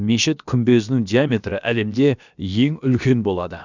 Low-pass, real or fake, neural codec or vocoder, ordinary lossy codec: 7.2 kHz; fake; codec, 16 kHz, about 1 kbps, DyCAST, with the encoder's durations; none